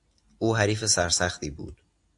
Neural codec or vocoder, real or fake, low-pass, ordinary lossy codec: none; real; 10.8 kHz; AAC, 64 kbps